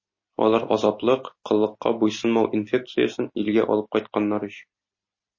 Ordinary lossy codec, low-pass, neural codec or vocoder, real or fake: MP3, 32 kbps; 7.2 kHz; none; real